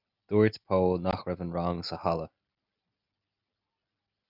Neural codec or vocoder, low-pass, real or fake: none; 5.4 kHz; real